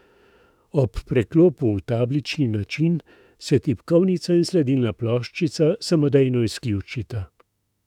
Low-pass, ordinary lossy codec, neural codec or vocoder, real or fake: 19.8 kHz; none; autoencoder, 48 kHz, 32 numbers a frame, DAC-VAE, trained on Japanese speech; fake